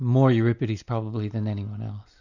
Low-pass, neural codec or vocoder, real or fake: 7.2 kHz; none; real